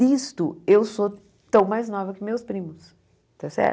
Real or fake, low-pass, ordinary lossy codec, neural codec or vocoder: real; none; none; none